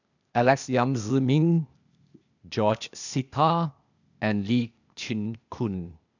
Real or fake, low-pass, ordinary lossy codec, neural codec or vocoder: fake; 7.2 kHz; none; codec, 16 kHz, 0.8 kbps, ZipCodec